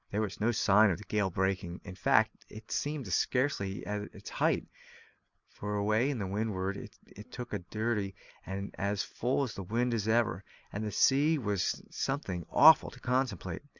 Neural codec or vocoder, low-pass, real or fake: none; 7.2 kHz; real